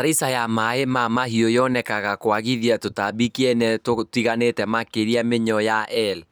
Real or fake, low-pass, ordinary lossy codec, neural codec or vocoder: real; none; none; none